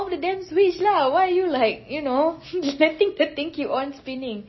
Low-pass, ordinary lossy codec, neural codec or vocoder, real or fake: 7.2 kHz; MP3, 24 kbps; none; real